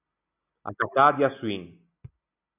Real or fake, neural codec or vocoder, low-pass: real; none; 3.6 kHz